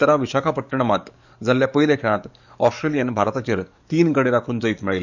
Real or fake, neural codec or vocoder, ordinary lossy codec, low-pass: fake; codec, 44.1 kHz, 7.8 kbps, DAC; none; 7.2 kHz